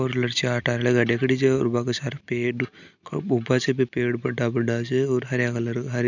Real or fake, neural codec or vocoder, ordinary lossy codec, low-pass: real; none; none; 7.2 kHz